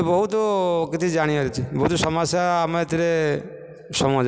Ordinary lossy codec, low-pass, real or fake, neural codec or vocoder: none; none; real; none